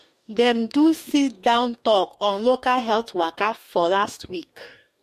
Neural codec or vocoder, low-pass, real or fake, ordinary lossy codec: codec, 44.1 kHz, 2.6 kbps, DAC; 14.4 kHz; fake; MP3, 64 kbps